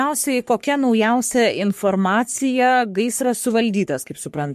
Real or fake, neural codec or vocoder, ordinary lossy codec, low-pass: fake; codec, 44.1 kHz, 3.4 kbps, Pupu-Codec; MP3, 64 kbps; 14.4 kHz